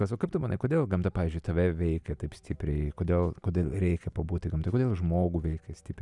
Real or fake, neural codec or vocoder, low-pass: real; none; 10.8 kHz